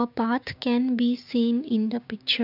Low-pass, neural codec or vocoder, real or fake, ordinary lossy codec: 5.4 kHz; codec, 16 kHz, 6 kbps, DAC; fake; none